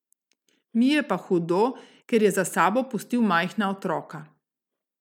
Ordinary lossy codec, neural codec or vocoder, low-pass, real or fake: none; none; 19.8 kHz; real